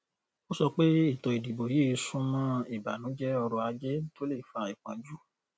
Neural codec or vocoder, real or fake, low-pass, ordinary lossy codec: none; real; none; none